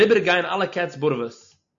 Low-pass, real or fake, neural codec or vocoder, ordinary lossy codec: 7.2 kHz; real; none; AAC, 64 kbps